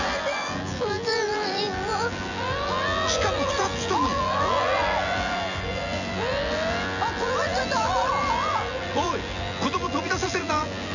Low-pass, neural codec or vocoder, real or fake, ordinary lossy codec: 7.2 kHz; vocoder, 24 kHz, 100 mel bands, Vocos; fake; none